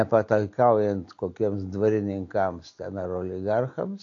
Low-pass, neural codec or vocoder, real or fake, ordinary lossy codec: 7.2 kHz; none; real; AAC, 48 kbps